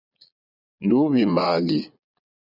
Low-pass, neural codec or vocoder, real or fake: 5.4 kHz; vocoder, 22.05 kHz, 80 mel bands, Vocos; fake